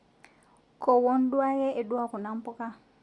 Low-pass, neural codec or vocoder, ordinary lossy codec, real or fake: 10.8 kHz; none; Opus, 64 kbps; real